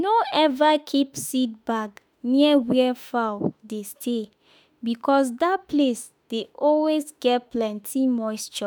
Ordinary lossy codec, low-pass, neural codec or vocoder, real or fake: none; none; autoencoder, 48 kHz, 32 numbers a frame, DAC-VAE, trained on Japanese speech; fake